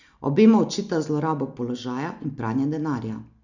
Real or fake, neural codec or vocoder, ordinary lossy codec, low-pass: real; none; none; 7.2 kHz